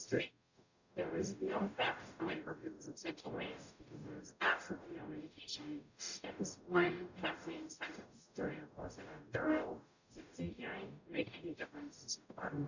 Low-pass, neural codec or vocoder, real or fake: 7.2 kHz; codec, 44.1 kHz, 0.9 kbps, DAC; fake